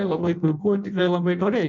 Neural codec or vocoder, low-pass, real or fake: codec, 16 kHz in and 24 kHz out, 0.6 kbps, FireRedTTS-2 codec; 7.2 kHz; fake